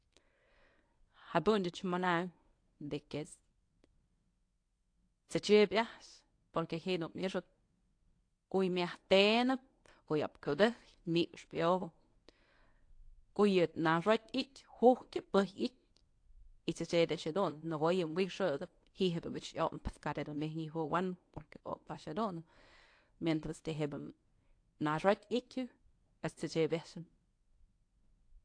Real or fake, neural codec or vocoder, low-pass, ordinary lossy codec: fake; codec, 24 kHz, 0.9 kbps, WavTokenizer, medium speech release version 1; 9.9 kHz; AAC, 48 kbps